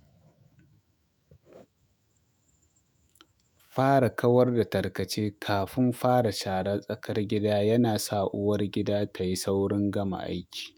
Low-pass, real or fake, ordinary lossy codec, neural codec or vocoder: none; fake; none; autoencoder, 48 kHz, 128 numbers a frame, DAC-VAE, trained on Japanese speech